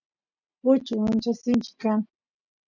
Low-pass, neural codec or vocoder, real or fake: 7.2 kHz; none; real